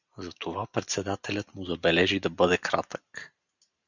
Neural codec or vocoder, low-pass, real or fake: none; 7.2 kHz; real